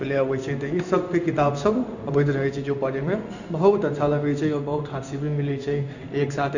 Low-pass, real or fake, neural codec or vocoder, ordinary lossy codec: 7.2 kHz; fake; codec, 16 kHz in and 24 kHz out, 1 kbps, XY-Tokenizer; none